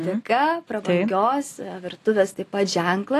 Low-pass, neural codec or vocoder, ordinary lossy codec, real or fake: 14.4 kHz; none; AAC, 48 kbps; real